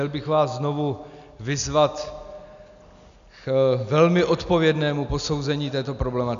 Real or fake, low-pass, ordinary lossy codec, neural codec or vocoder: real; 7.2 kHz; AAC, 96 kbps; none